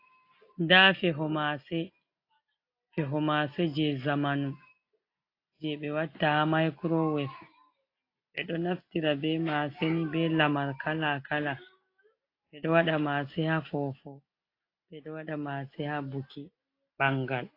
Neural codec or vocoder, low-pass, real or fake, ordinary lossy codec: none; 5.4 kHz; real; AAC, 32 kbps